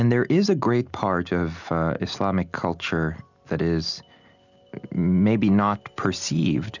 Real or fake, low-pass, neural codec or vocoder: real; 7.2 kHz; none